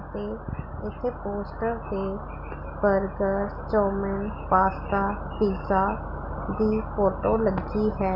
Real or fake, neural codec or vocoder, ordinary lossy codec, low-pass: real; none; none; 5.4 kHz